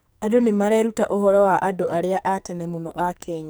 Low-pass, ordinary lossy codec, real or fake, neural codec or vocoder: none; none; fake; codec, 44.1 kHz, 2.6 kbps, SNAC